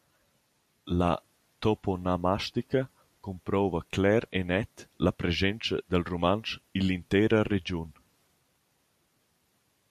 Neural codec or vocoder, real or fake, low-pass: none; real; 14.4 kHz